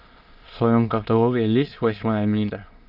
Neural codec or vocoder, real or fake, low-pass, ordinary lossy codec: autoencoder, 22.05 kHz, a latent of 192 numbers a frame, VITS, trained on many speakers; fake; 5.4 kHz; Opus, 24 kbps